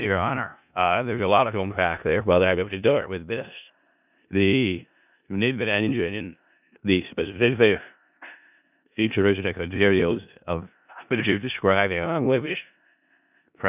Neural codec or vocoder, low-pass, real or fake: codec, 16 kHz in and 24 kHz out, 0.4 kbps, LongCat-Audio-Codec, four codebook decoder; 3.6 kHz; fake